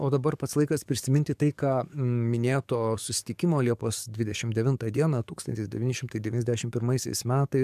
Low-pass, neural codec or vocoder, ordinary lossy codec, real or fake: 14.4 kHz; codec, 44.1 kHz, 7.8 kbps, DAC; MP3, 96 kbps; fake